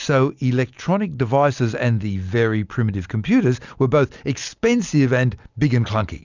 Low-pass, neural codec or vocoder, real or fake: 7.2 kHz; none; real